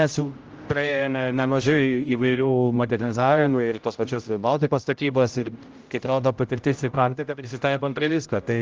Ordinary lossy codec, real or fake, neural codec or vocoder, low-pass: Opus, 24 kbps; fake; codec, 16 kHz, 0.5 kbps, X-Codec, HuBERT features, trained on general audio; 7.2 kHz